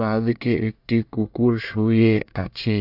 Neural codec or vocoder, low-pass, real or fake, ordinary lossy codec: codec, 24 kHz, 1 kbps, SNAC; 5.4 kHz; fake; AAC, 48 kbps